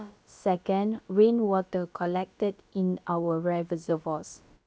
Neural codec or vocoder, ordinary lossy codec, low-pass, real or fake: codec, 16 kHz, about 1 kbps, DyCAST, with the encoder's durations; none; none; fake